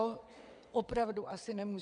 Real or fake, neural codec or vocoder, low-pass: fake; vocoder, 22.05 kHz, 80 mel bands, WaveNeXt; 9.9 kHz